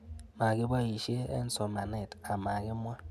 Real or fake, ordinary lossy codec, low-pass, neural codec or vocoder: real; none; 14.4 kHz; none